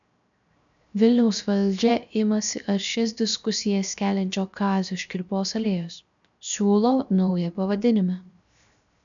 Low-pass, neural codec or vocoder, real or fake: 7.2 kHz; codec, 16 kHz, 0.7 kbps, FocalCodec; fake